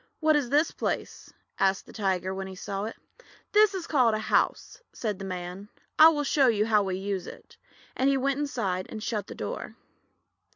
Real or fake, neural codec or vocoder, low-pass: real; none; 7.2 kHz